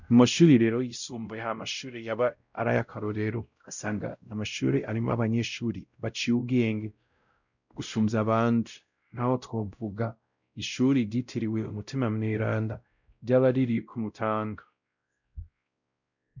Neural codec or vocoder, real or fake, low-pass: codec, 16 kHz, 0.5 kbps, X-Codec, WavLM features, trained on Multilingual LibriSpeech; fake; 7.2 kHz